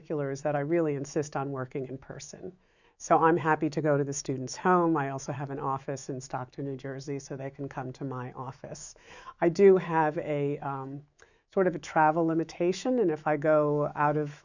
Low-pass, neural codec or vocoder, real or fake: 7.2 kHz; autoencoder, 48 kHz, 128 numbers a frame, DAC-VAE, trained on Japanese speech; fake